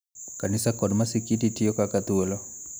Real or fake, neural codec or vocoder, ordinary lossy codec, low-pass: real; none; none; none